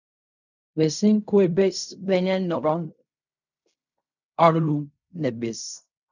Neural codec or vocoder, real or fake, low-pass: codec, 16 kHz in and 24 kHz out, 0.4 kbps, LongCat-Audio-Codec, fine tuned four codebook decoder; fake; 7.2 kHz